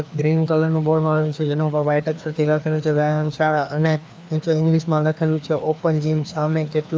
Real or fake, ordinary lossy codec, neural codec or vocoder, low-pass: fake; none; codec, 16 kHz, 2 kbps, FreqCodec, larger model; none